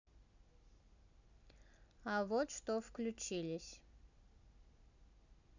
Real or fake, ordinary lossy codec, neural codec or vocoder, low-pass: real; none; none; 7.2 kHz